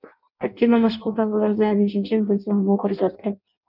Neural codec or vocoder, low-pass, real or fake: codec, 16 kHz in and 24 kHz out, 0.6 kbps, FireRedTTS-2 codec; 5.4 kHz; fake